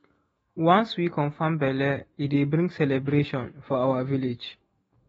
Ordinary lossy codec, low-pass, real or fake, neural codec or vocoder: AAC, 24 kbps; 19.8 kHz; fake; autoencoder, 48 kHz, 128 numbers a frame, DAC-VAE, trained on Japanese speech